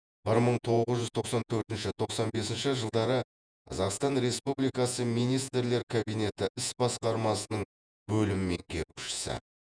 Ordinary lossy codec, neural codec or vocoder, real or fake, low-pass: none; vocoder, 48 kHz, 128 mel bands, Vocos; fake; 9.9 kHz